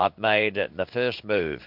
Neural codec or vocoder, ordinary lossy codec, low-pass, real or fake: codec, 16 kHz in and 24 kHz out, 1 kbps, XY-Tokenizer; MP3, 48 kbps; 5.4 kHz; fake